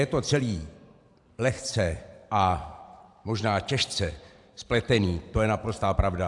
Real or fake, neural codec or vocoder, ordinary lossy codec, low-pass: fake; vocoder, 44.1 kHz, 128 mel bands every 512 samples, BigVGAN v2; AAC, 64 kbps; 10.8 kHz